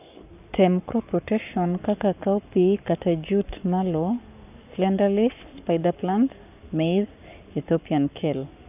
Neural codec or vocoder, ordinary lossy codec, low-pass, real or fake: codec, 44.1 kHz, 7.8 kbps, Pupu-Codec; none; 3.6 kHz; fake